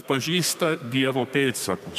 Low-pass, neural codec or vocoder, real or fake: 14.4 kHz; codec, 32 kHz, 1.9 kbps, SNAC; fake